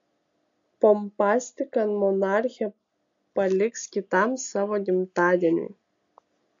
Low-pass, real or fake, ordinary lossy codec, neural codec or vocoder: 7.2 kHz; real; MP3, 48 kbps; none